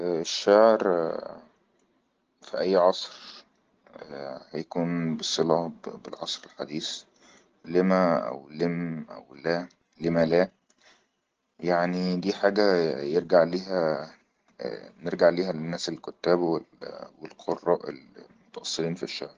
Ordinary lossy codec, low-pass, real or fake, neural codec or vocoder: Opus, 16 kbps; 7.2 kHz; real; none